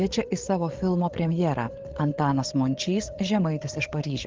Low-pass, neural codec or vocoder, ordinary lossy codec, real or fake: 7.2 kHz; codec, 16 kHz, 8 kbps, FreqCodec, larger model; Opus, 16 kbps; fake